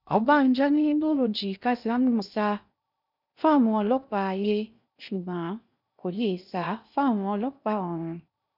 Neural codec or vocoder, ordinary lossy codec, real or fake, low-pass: codec, 16 kHz in and 24 kHz out, 0.6 kbps, FocalCodec, streaming, 2048 codes; none; fake; 5.4 kHz